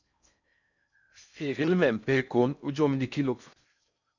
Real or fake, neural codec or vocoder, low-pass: fake; codec, 16 kHz in and 24 kHz out, 0.6 kbps, FocalCodec, streaming, 4096 codes; 7.2 kHz